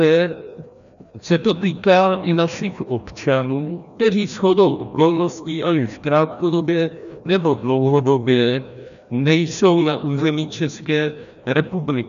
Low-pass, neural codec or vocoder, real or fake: 7.2 kHz; codec, 16 kHz, 1 kbps, FreqCodec, larger model; fake